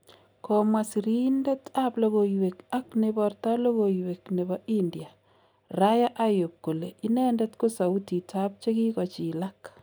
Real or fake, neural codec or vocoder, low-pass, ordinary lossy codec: real; none; none; none